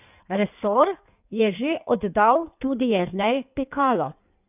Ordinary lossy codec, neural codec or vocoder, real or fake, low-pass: none; codec, 16 kHz in and 24 kHz out, 1.1 kbps, FireRedTTS-2 codec; fake; 3.6 kHz